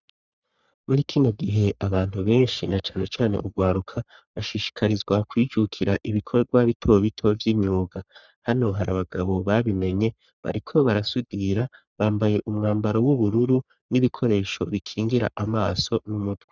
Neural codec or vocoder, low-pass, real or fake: codec, 44.1 kHz, 3.4 kbps, Pupu-Codec; 7.2 kHz; fake